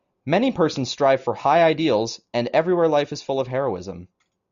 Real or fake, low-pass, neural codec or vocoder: real; 7.2 kHz; none